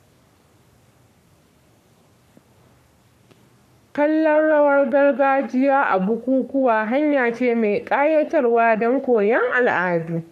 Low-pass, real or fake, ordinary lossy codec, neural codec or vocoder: 14.4 kHz; fake; none; codec, 44.1 kHz, 3.4 kbps, Pupu-Codec